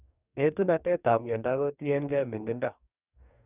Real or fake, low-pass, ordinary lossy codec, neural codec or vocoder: fake; 3.6 kHz; none; codec, 44.1 kHz, 2.6 kbps, DAC